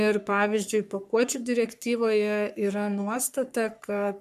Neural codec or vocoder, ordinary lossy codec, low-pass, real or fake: codec, 44.1 kHz, 3.4 kbps, Pupu-Codec; AAC, 96 kbps; 14.4 kHz; fake